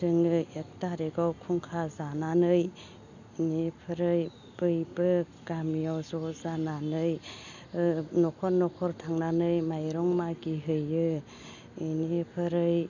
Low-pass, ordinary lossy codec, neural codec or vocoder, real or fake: 7.2 kHz; none; none; real